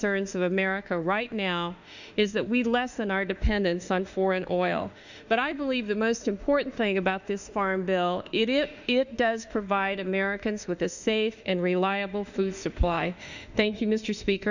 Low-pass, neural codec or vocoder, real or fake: 7.2 kHz; autoencoder, 48 kHz, 32 numbers a frame, DAC-VAE, trained on Japanese speech; fake